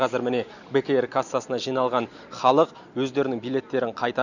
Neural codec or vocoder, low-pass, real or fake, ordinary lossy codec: none; 7.2 kHz; real; none